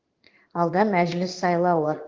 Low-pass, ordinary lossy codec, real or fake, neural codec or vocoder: 7.2 kHz; Opus, 16 kbps; fake; codec, 16 kHz in and 24 kHz out, 1 kbps, XY-Tokenizer